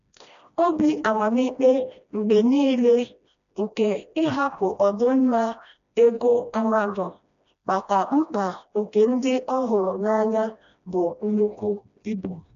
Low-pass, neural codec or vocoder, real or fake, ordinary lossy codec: 7.2 kHz; codec, 16 kHz, 1 kbps, FreqCodec, smaller model; fake; none